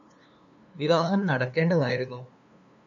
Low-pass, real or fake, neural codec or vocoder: 7.2 kHz; fake; codec, 16 kHz, 2 kbps, FunCodec, trained on LibriTTS, 25 frames a second